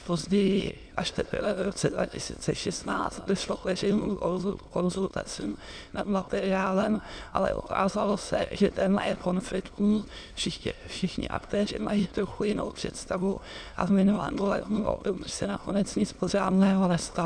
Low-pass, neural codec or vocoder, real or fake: 9.9 kHz; autoencoder, 22.05 kHz, a latent of 192 numbers a frame, VITS, trained on many speakers; fake